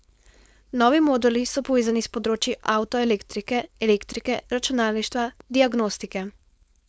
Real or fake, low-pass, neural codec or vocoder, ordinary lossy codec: fake; none; codec, 16 kHz, 4.8 kbps, FACodec; none